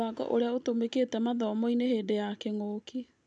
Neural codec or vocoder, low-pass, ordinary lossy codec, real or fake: none; 9.9 kHz; none; real